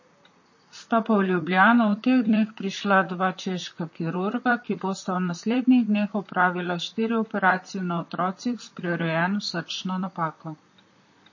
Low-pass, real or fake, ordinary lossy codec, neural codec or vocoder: 7.2 kHz; fake; MP3, 32 kbps; vocoder, 44.1 kHz, 128 mel bands, Pupu-Vocoder